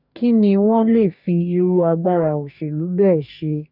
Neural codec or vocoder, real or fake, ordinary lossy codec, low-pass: codec, 44.1 kHz, 2.6 kbps, DAC; fake; none; 5.4 kHz